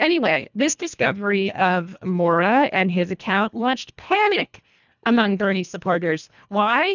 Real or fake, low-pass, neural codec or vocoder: fake; 7.2 kHz; codec, 24 kHz, 1.5 kbps, HILCodec